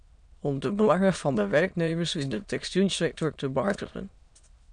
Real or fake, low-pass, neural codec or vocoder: fake; 9.9 kHz; autoencoder, 22.05 kHz, a latent of 192 numbers a frame, VITS, trained on many speakers